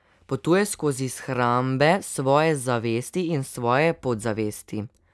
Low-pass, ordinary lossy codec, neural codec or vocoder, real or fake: none; none; none; real